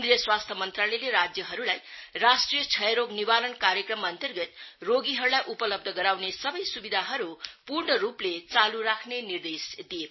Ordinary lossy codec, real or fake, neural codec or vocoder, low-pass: MP3, 24 kbps; real; none; 7.2 kHz